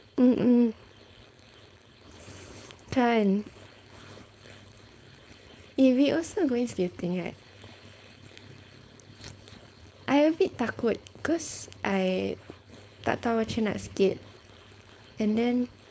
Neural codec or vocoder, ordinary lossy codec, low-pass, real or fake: codec, 16 kHz, 4.8 kbps, FACodec; none; none; fake